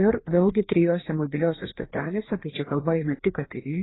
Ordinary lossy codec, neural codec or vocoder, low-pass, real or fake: AAC, 16 kbps; codec, 16 kHz, 2 kbps, FreqCodec, larger model; 7.2 kHz; fake